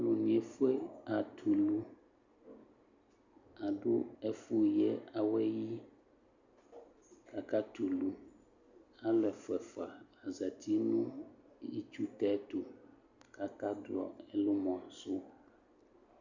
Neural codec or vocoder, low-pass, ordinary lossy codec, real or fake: none; 7.2 kHz; Opus, 64 kbps; real